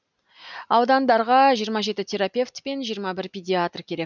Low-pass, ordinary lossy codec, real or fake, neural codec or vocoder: 7.2 kHz; none; real; none